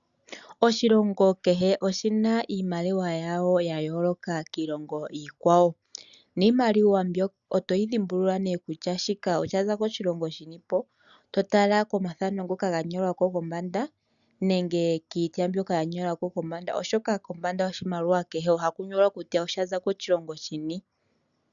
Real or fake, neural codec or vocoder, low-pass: real; none; 7.2 kHz